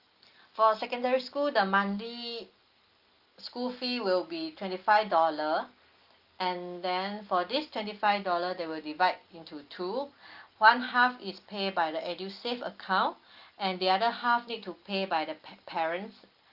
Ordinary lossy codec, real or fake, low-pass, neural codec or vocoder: Opus, 32 kbps; real; 5.4 kHz; none